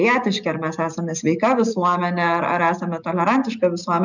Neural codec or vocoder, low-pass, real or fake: none; 7.2 kHz; real